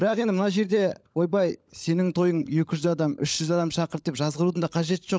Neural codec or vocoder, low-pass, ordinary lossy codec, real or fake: codec, 16 kHz, 16 kbps, FunCodec, trained on LibriTTS, 50 frames a second; none; none; fake